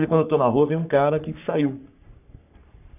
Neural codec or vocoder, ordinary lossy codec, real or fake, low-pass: codec, 44.1 kHz, 3.4 kbps, Pupu-Codec; none; fake; 3.6 kHz